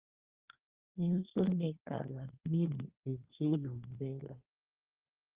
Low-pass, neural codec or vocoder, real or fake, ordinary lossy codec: 3.6 kHz; codec, 24 kHz, 1 kbps, SNAC; fake; Opus, 32 kbps